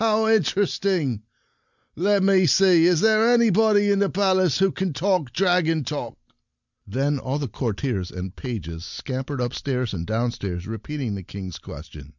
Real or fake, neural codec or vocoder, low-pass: real; none; 7.2 kHz